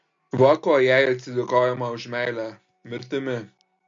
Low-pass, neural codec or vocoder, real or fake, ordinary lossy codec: 7.2 kHz; none; real; MP3, 48 kbps